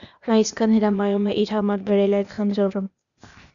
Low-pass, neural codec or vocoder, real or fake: 7.2 kHz; codec, 16 kHz, 0.8 kbps, ZipCodec; fake